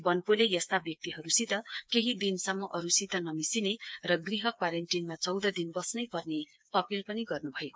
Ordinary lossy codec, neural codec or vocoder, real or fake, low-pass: none; codec, 16 kHz, 4 kbps, FreqCodec, smaller model; fake; none